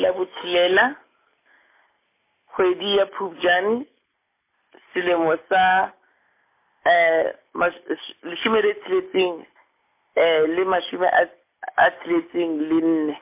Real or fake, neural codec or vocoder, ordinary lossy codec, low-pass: real; none; MP3, 24 kbps; 3.6 kHz